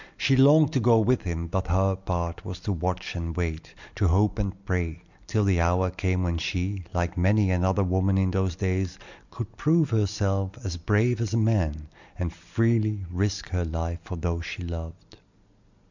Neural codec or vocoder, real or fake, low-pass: none; real; 7.2 kHz